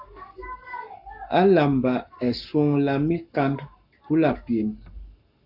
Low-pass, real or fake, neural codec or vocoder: 5.4 kHz; fake; codec, 44.1 kHz, 7.8 kbps, Pupu-Codec